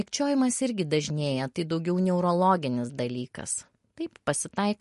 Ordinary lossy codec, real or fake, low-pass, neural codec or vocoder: MP3, 48 kbps; real; 14.4 kHz; none